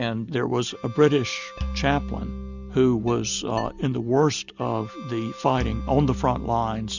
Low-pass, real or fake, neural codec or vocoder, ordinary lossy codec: 7.2 kHz; real; none; Opus, 64 kbps